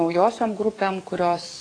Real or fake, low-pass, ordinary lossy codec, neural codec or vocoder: fake; 9.9 kHz; AAC, 32 kbps; codec, 44.1 kHz, 7.8 kbps, DAC